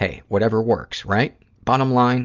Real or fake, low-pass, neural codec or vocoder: real; 7.2 kHz; none